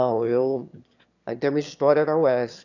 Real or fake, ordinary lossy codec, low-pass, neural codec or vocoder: fake; none; 7.2 kHz; autoencoder, 22.05 kHz, a latent of 192 numbers a frame, VITS, trained on one speaker